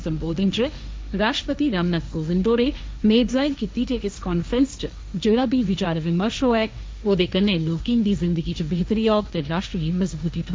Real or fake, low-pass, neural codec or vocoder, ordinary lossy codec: fake; none; codec, 16 kHz, 1.1 kbps, Voila-Tokenizer; none